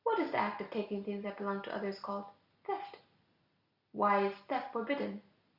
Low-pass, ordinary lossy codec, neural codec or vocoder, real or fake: 5.4 kHz; Opus, 64 kbps; none; real